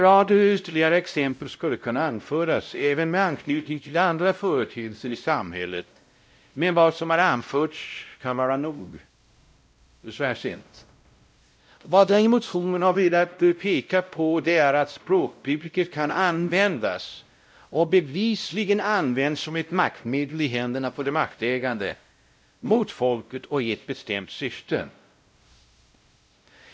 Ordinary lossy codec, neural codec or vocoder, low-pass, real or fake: none; codec, 16 kHz, 0.5 kbps, X-Codec, WavLM features, trained on Multilingual LibriSpeech; none; fake